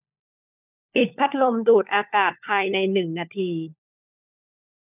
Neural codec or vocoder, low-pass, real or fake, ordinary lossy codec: codec, 16 kHz, 4 kbps, FunCodec, trained on LibriTTS, 50 frames a second; 3.6 kHz; fake; none